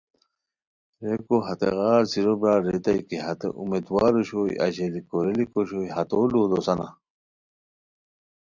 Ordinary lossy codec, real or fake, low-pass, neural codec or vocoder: Opus, 64 kbps; real; 7.2 kHz; none